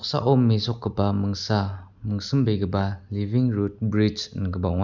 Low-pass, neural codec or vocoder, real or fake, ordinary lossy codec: 7.2 kHz; none; real; none